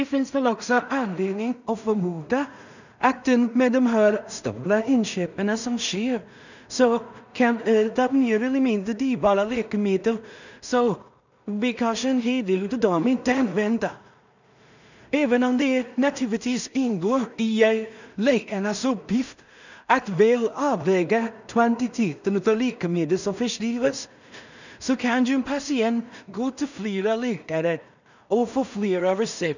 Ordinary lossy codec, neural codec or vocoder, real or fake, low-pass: none; codec, 16 kHz in and 24 kHz out, 0.4 kbps, LongCat-Audio-Codec, two codebook decoder; fake; 7.2 kHz